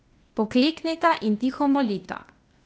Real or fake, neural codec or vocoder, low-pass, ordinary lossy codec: fake; codec, 16 kHz, 0.8 kbps, ZipCodec; none; none